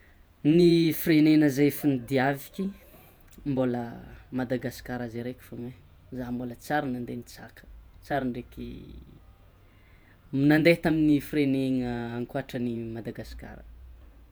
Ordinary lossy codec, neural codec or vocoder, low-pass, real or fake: none; vocoder, 48 kHz, 128 mel bands, Vocos; none; fake